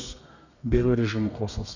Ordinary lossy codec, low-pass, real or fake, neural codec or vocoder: none; 7.2 kHz; fake; codec, 32 kHz, 1.9 kbps, SNAC